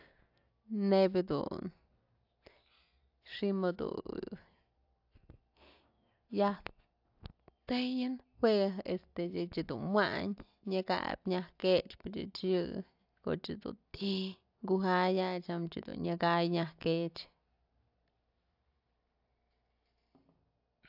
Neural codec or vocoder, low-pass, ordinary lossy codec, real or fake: none; 5.4 kHz; AAC, 48 kbps; real